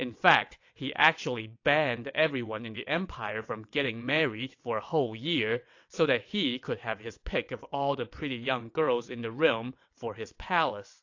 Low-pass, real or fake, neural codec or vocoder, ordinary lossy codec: 7.2 kHz; fake; vocoder, 22.05 kHz, 80 mel bands, WaveNeXt; AAC, 48 kbps